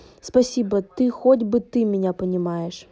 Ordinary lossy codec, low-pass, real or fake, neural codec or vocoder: none; none; real; none